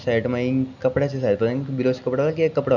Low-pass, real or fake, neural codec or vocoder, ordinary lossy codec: 7.2 kHz; real; none; none